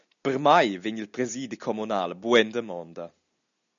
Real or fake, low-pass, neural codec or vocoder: real; 7.2 kHz; none